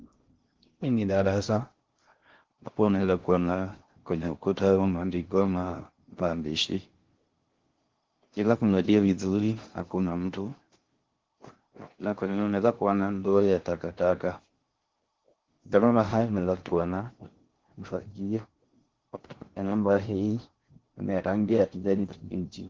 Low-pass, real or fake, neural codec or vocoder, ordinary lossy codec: 7.2 kHz; fake; codec, 16 kHz in and 24 kHz out, 0.6 kbps, FocalCodec, streaming, 2048 codes; Opus, 16 kbps